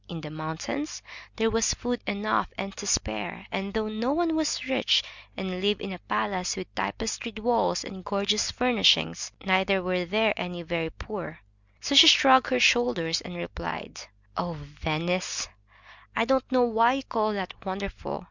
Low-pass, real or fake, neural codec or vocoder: 7.2 kHz; real; none